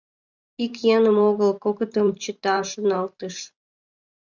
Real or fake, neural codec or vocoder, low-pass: fake; vocoder, 44.1 kHz, 128 mel bands every 512 samples, BigVGAN v2; 7.2 kHz